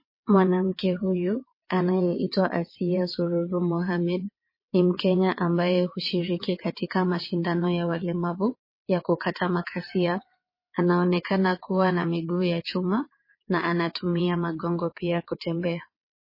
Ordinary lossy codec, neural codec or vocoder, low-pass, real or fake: MP3, 24 kbps; vocoder, 22.05 kHz, 80 mel bands, WaveNeXt; 5.4 kHz; fake